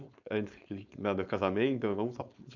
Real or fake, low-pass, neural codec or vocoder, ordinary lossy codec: fake; 7.2 kHz; codec, 16 kHz, 4.8 kbps, FACodec; none